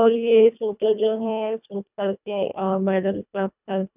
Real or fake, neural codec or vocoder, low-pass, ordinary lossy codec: fake; codec, 24 kHz, 1.5 kbps, HILCodec; 3.6 kHz; none